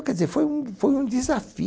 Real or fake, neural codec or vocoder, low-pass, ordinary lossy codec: real; none; none; none